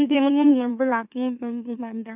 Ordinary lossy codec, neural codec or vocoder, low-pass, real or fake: none; autoencoder, 44.1 kHz, a latent of 192 numbers a frame, MeloTTS; 3.6 kHz; fake